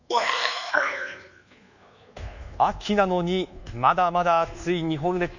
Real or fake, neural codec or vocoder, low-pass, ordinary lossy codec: fake; codec, 24 kHz, 1.2 kbps, DualCodec; 7.2 kHz; none